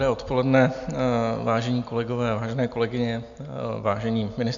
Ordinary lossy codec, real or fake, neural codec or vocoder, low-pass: MP3, 64 kbps; real; none; 7.2 kHz